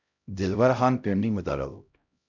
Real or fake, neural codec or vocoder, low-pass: fake; codec, 16 kHz, 0.5 kbps, X-Codec, HuBERT features, trained on LibriSpeech; 7.2 kHz